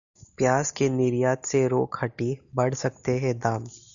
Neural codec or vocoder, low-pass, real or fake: none; 7.2 kHz; real